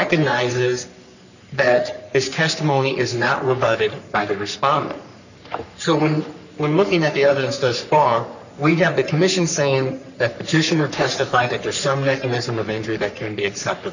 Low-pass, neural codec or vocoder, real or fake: 7.2 kHz; codec, 44.1 kHz, 3.4 kbps, Pupu-Codec; fake